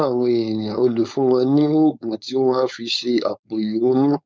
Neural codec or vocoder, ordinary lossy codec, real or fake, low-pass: codec, 16 kHz, 4.8 kbps, FACodec; none; fake; none